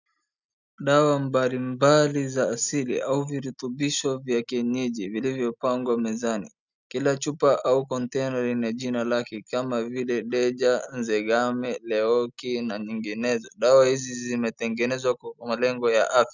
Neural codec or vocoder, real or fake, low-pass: none; real; 7.2 kHz